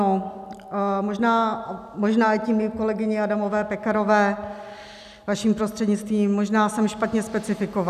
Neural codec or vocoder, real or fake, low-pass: none; real; 14.4 kHz